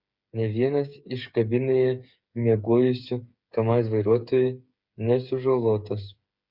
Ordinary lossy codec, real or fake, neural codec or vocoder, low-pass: Opus, 64 kbps; fake; codec, 16 kHz, 8 kbps, FreqCodec, smaller model; 5.4 kHz